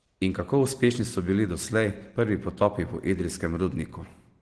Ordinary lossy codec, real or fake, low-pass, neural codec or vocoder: Opus, 16 kbps; real; 10.8 kHz; none